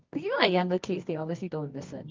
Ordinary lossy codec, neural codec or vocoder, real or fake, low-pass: Opus, 24 kbps; codec, 24 kHz, 0.9 kbps, WavTokenizer, medium music audio release; fake; 7.2 kHz